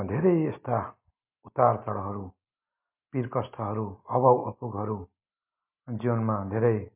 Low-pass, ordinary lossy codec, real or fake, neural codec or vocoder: 3.6 kHz; MP3, 32 kbps; real; none